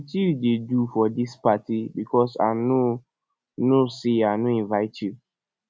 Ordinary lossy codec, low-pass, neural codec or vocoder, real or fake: none; none; none; real